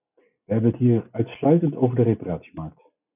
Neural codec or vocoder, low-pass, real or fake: none; 3.6 kHz; real